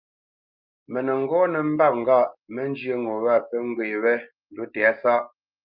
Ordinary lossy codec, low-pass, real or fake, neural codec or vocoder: Opus, 24 kbps; 5.4 kHz; real; none